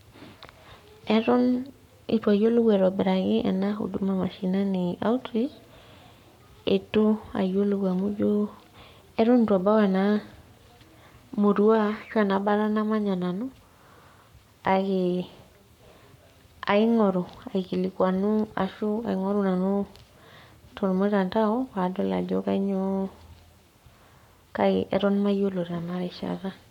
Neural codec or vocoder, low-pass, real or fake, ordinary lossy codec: codec, 44.1 kHz, 7.8 kbps, Pupu-Codec; 19.8 kHz; fake; none